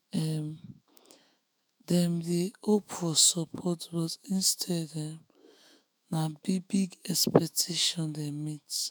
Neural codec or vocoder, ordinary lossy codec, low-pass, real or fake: autoencoder, 48 kHz, 128 numbers a frame, DAC-VAE, trained on Japanese speech; none; none; fake